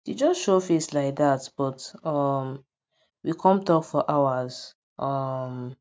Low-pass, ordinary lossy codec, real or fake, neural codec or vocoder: none; none; real; none